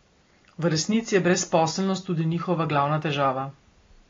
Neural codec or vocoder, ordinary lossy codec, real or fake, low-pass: none; AAC, 32 kbps; real; 7.2 kHz